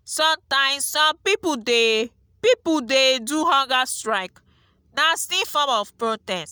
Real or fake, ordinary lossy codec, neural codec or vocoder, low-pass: real; none; none; none